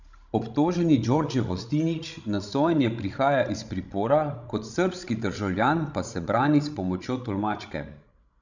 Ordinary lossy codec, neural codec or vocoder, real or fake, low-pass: none; codec, 16 kHz, 16 kbps, FreqCodec, larger model; fake; 7.2 kHz